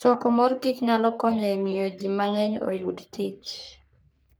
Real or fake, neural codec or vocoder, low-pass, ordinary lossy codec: fake; codec, 44.1 kHz, 3.4 kbps, Pupu-Codec; none; none